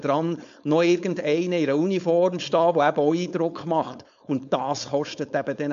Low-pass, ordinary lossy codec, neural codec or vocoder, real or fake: 7.2 kHz; MP3, 64 kbps; codec, 16 kHz, 4.8 kbps, FACodec; fake